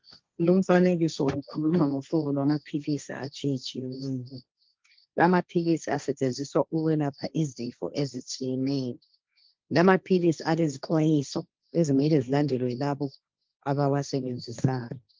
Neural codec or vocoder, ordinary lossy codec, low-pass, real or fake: codec, 16 kHz, 1.1 kbps, Voila-Tokenizer; Opus, 32 kbps; 7.2 kHz; fake